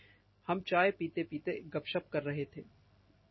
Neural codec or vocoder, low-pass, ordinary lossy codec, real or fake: none; 7.2 kHz; MP3, 24 kbps; real